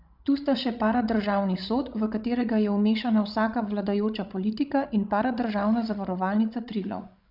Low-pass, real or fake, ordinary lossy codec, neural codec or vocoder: 5.4 kHz; fake; none; codec, 16 kHz, 16 kbps, FreqCodec, larger model